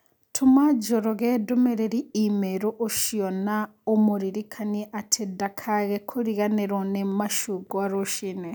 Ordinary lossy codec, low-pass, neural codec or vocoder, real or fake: none; none; none; real